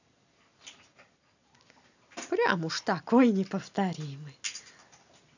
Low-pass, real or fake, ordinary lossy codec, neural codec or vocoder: 7.2 kHz; real; none; none